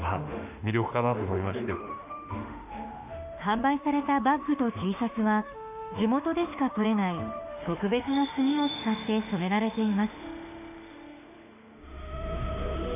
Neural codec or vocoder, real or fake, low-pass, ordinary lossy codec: autoencoder, 48 kHz, 32 numbers a frame, DAC-VAE, trained on Japanese speech; fake; 3.6 kHz; none